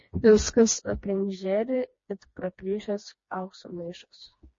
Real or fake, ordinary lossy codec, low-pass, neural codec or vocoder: fake; MP3, 32 kbps; 7.2 kHz; codec, 16 kHz, 2 kbps, FreqCodec, smaller model